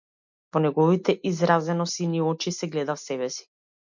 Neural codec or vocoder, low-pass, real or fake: none; 7.2 kHz; real